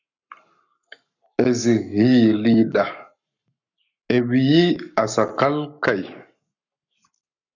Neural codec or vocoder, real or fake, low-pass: codec, 44.1 kHz, 7.8 kbps, Pupu-Codec; fake; 7.2 kHz